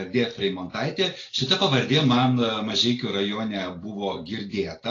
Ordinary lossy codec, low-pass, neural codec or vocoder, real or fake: AAC, 32 kbps; 7.2 kHz; none; real